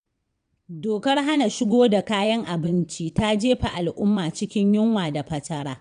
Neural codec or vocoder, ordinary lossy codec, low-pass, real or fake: vocoder, 22.05 kHz, 80 mel bands, WaveNeXt; none; 9.9 kHz; fake